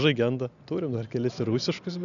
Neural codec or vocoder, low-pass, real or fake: none; 7.2 kHz; real